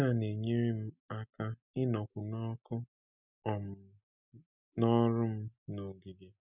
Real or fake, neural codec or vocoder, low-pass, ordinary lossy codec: real; none; 3.6 kHz; none